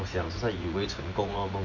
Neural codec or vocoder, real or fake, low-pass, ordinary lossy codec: vocoder, 24 kHz, 100 mel bands, Vocos; fake; 7.2 kHz; none